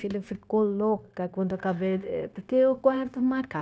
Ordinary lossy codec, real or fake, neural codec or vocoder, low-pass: none; fake; codec, 16 kHz, 0.9 kbps, LongCat-Audio-Codec; none